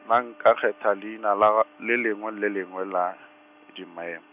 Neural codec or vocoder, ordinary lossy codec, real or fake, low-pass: none; none; real; 3.6 kHz